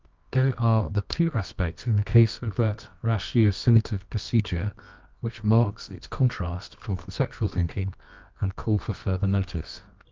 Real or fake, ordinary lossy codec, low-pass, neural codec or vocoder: fake; Opus, 32 kbps; 7.2 kHz; codec, 24 kHz, 0.9 kbps, WavTokenizer, medium music audio release